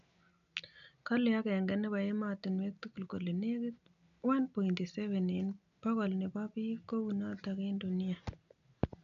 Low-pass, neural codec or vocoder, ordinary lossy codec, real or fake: 7.2 kHz; none; none; real